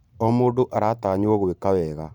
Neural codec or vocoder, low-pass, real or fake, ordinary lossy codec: vocoder, 44.1 kHz, 128 mel bands every 512 samples, BigVGAN v2; 19.8 kHz; fake; none